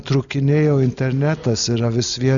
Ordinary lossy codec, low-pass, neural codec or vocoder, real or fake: MP3, 96 kbps; 7.2 kHz; none; real